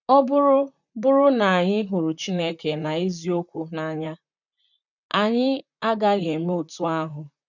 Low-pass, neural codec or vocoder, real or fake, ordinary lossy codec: 7.2 kHz; vocoder, 44.1 kHz, 128 mel bands, Pupu-Vocoder; fake; none